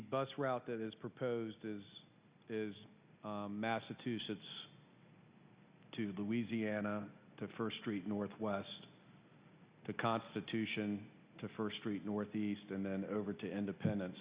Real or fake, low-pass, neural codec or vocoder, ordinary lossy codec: real; 3.6 kHz; none; Opus, 64 kbps